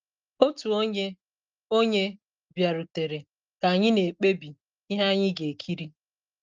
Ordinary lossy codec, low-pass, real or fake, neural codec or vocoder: Opus, 24 kbps; 7.2 kHz; real; none